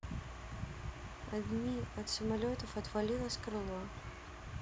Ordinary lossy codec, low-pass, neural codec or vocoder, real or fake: none; none; none; real